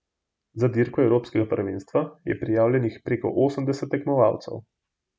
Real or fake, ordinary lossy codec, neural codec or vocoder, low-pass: real; none; none; none